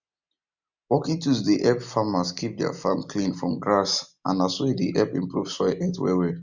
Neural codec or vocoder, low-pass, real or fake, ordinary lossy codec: none; 7.2 kHz; real; none